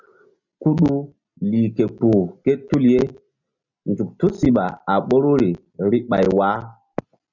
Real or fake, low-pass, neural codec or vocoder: real; 7.2 kHz; none